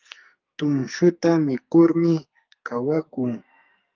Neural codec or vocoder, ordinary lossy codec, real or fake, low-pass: codec, 44.1 kHz, 2.6 kbps, SNAC; Opus, 32 kbps; fake; 7.2 kHz